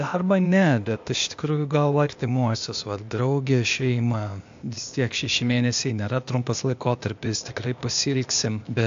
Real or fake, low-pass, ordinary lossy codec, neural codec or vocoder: fake; 7.2 kHz; AAC, 64 kbps; codec, 16 kHz, 0.8 kbps, ZipCodec